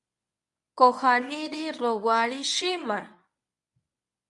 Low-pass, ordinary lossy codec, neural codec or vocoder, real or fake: 10.8 kHz; MP3, 64 kbps; codec, 24 kHz, 0.9 kbps, WavTokenizer, medium speech release version 1; fake